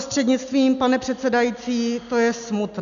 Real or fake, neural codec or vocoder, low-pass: real; none; 7.2 kHz